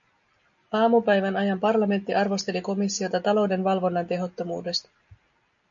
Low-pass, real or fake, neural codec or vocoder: 7.2 kHz; real; none